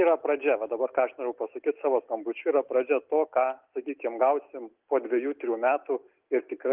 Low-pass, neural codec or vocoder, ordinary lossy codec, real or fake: 3.6 kHz; none; Opus, 32 kbps; real